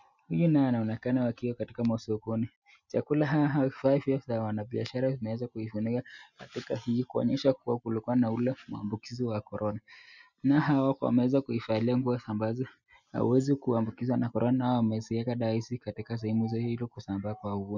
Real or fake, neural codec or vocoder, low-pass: real; none; 7.2 kHz